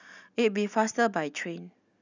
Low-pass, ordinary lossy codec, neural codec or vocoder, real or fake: 7.2 kHz; none; none; real